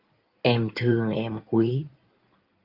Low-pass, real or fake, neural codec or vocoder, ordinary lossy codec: 5.4 kHz; fake; codec, 16 kHz in and 24 kHz out, 2.2 kbps, FireRedTTS-2 codec; Opus, 24 kbps